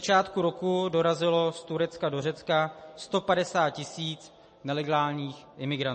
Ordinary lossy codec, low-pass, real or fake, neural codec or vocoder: MP3, 32 kbps; 10.8 kHz; real; none